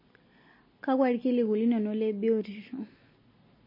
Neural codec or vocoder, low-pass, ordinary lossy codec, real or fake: none; 5.4 kHz; MP3, 24 kbps; real